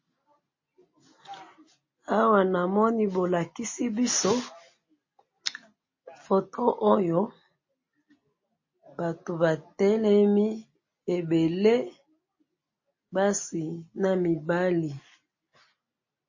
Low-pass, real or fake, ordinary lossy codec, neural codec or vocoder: 7.2 kHz; real; MP3, 32 kbps; none